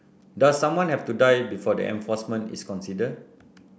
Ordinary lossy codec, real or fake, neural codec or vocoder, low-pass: none; real; none; none